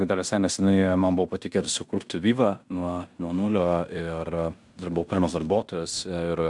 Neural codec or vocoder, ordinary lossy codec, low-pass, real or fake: codec, 16 kHz in and 24 kHz out, 0.9 kbps, LongCat-Audio-Codec, fine tuned four codebook decoder; MP3, 96 kbps; 10.8 kHz; fake